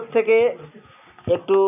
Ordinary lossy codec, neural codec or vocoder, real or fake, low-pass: none; none; real; 3.6 kHz